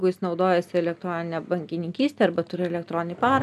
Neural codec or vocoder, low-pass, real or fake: none; 14.4 kHz; real